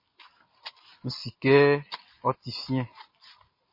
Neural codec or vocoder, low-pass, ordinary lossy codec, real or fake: none; 5.4 kHz; MP3, 24 kbps; real